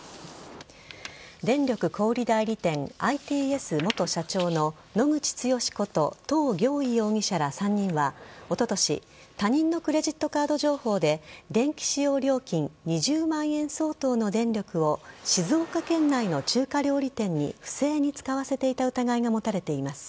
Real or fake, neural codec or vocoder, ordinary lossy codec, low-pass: real; none; none; none